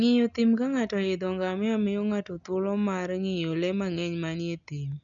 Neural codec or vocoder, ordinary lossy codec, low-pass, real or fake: none; none; 7.2 kHz; real